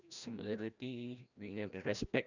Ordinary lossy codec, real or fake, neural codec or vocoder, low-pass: none; fake; codec, 16 kHz, 1 kbps, FreqCodec, larger model; 7.2 kHz